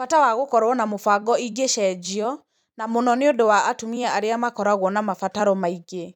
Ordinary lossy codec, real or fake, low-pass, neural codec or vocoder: none; fake; 19.8 kHz; vocoder, 44.1 kHz, 128 mel bands every 256 samples, BigVGAN v2